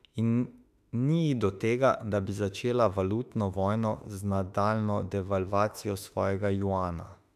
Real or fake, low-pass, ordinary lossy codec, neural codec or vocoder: fake; 14.4 kHz; none; autoencoder, 48 kHz, 32 numbers a frame, DAC-VAE, trained on Japanese speech